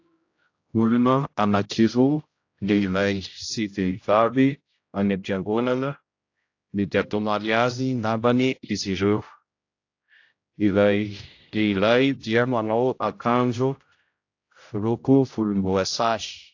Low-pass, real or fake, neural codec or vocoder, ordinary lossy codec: 7.2 kHz; fake; codec, 16 kHz, 0.5 kbps, X-Codec, HuBERT features, trained on general audio; AAC, 48 kbps